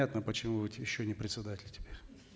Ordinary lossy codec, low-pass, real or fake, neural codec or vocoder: none; none; real; none